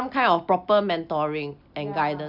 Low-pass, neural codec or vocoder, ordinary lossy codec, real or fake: 5.4 kHz; none; none; real